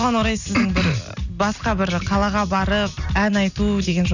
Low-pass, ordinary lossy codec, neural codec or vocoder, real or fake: 7.2 kHz; none; none; real